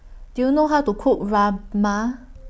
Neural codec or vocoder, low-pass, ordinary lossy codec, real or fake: none; none; none; real